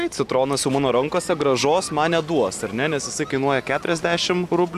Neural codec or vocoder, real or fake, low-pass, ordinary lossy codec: none; real; 14.4 kHz; AAC, 96 kbps